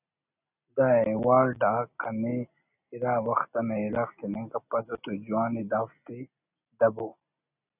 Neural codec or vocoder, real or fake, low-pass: none; real; 3.6 kHz